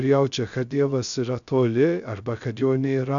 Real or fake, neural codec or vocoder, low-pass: fake; codec, 16 kHz, 0.3 kbps, FocalCodec; 7.2 kHz